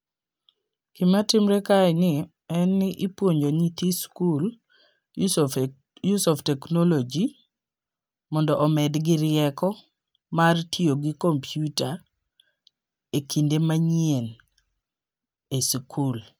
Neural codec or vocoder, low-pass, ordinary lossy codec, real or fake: none; none; none; real